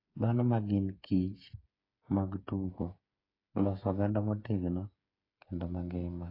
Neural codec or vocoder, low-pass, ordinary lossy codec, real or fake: codec, 16 kHz, 8 kbps, FreqCodec, smaller model; 5.4 kHz; AAC, 24 kbps; fake